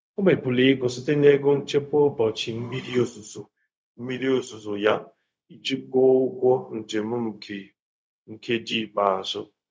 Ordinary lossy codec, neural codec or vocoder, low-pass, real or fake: none; codec, 16 kHz, 0.4 kbps, LongCat-Audio-Codec; none; fake